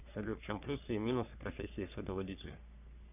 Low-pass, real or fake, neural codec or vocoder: 3.6 kHz; fake; codec, 44.1 kHz, 3.4 kbps, Pupu-Codec